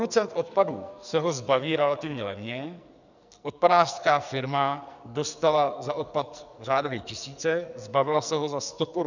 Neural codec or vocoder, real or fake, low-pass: codec, 44.1 kHz, 2.6 kbps, SNAC; fake; 7.2 kHz